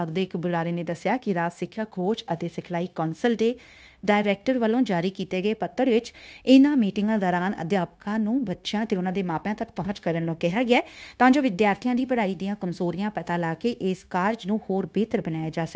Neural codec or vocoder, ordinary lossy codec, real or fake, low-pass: codec, 16 kHz, 0.9 kbps, LongCat-Audio-Codec; none; fake; none